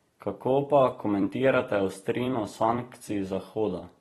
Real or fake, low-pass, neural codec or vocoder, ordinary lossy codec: fake; 19.8 kHz; vocoder, 44.1 kHz, 128 mel bands every 256 samples, BigVGAN v2; AAC, 32 kbps